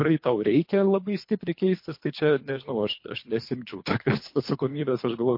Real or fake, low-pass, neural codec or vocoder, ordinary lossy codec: fake; 5.4 kHz; codec, 24 kHz, 3 kbps, HILCodec; MP3, 32 kbps